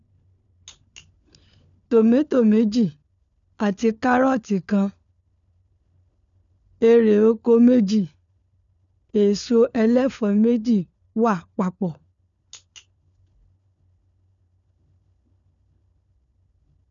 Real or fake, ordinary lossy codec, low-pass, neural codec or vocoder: fake; none; 7.2 kHz; codec, 16 kHz, 4 kbps, FunCodec, trained on LibriTTS, 50 frames a second